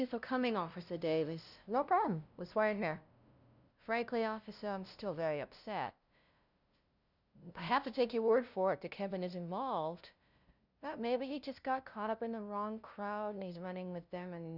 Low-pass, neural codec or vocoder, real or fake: 5.4 kHz; codec, 16 kHz, 0.5 kbps, FunCodec, trained on LibriTTS, 25 frames a second; fake